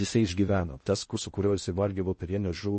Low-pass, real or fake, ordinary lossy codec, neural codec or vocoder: 10.8 kHz; fake; MP3, 32 kbps; codec, 16 kHz in and 24 kHz out, 0.6 kbps, FocalCodec, streaming, 4096 codes